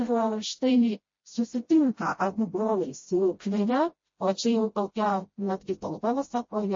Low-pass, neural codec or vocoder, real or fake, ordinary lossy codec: 7.2 kHz; codec, 16 kHz, 0.5 kbps, FreqCodec, smaller model; fake; MP3, 32 kbps